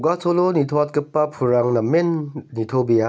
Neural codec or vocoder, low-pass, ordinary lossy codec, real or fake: none; none; none; real